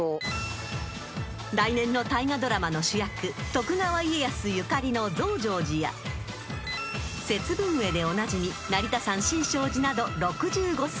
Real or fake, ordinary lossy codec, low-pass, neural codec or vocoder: real; none; none; none